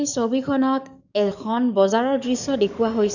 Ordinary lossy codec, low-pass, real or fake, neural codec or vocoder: none; 7.2 kHz; fake; codec, 44.1 kHz, 7.8 kbps, DAC